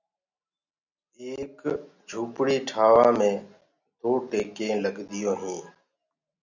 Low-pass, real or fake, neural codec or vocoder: 7.2 kHz; real; none